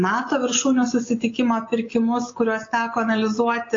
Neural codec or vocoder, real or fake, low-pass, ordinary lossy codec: none; real; 7.2 kHz; AAC, 32 kbps